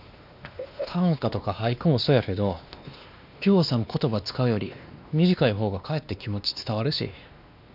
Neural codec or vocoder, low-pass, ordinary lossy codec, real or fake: codec, 16 kHz, 2 kbps, X-Codec, WavLM features, trained on Multilingual LibriSpeech; 5.4 kHz; none; fake